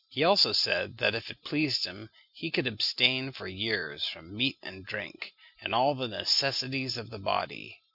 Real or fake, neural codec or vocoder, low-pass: real; none; 5.4 kHz